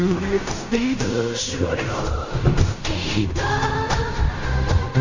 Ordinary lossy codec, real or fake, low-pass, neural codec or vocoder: Opus, 64 kbps; fake; 7.2 kHz; codec, 16 kHz in and 24 kHz out, 0.4 kbps, LongCat-Audio-Codec, fine tuned four codebook decoder